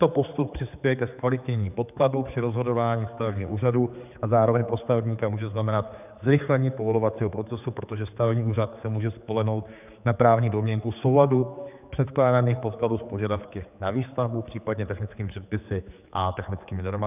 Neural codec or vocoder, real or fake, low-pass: codec, 16 kHz, 4 kbps, X-Codec, HuBERT features, trained on general audio; fake; 3.6 kHz